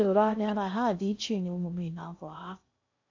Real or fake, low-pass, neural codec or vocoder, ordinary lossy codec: fake; 7.2 kHz; codec, 16 kHz in and 24 kHz out, 0.6 kbps, FocalCodec, streaming, 2048 codes; AAC, 48 kbps